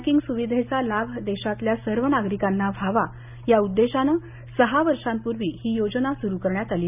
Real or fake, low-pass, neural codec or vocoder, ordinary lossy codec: real; 3.6 kHz; none; none